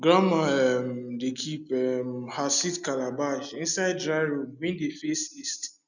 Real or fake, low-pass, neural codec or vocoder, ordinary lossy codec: real; 7.2 kHz; none; none